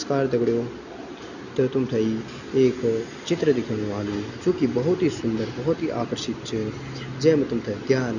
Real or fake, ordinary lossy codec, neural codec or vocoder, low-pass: real; none; none; 7.2 kHz